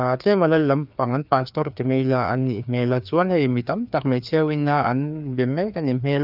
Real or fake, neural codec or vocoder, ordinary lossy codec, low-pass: fake; codec, 16 kHz, 2 kbps, FreqCodec, larger model; none; 5.4 kHz